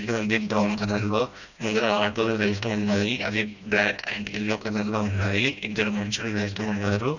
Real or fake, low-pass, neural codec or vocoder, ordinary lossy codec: fake; 7.2 kHz; codec, 16 kHz, 1 kbps, FreqCodec, smaller model; none